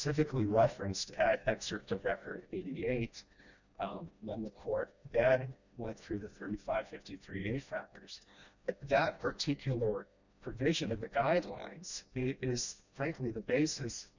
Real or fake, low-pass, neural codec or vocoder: fake; 7.2 kHz; codec, 16 kHz, 1 kbps, FreqCodec, smaller model